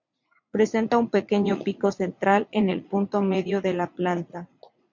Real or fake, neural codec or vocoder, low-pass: fake; vocoder, 44.1 kHz, 80 mel bands, Vocos; 7.2 kHz